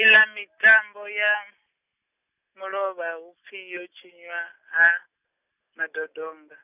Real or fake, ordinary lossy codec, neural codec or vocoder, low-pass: real; none; none; 3.6 kHz